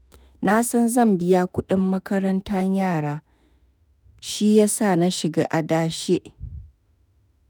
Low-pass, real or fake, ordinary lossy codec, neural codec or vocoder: none; fake; none; autoencoder, 48 kHz, 32 numbers a frame, DAC-VAE, trained on Japanese speech